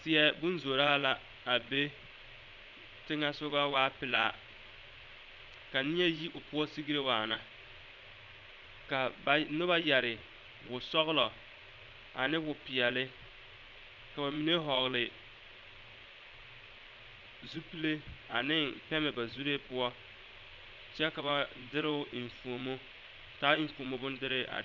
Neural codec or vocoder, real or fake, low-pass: vocoder, 22.05 kHz, 80 mel bands, Vocos; fake; 7.2 kHz